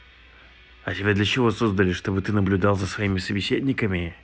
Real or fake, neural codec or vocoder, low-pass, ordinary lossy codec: real; none; none; none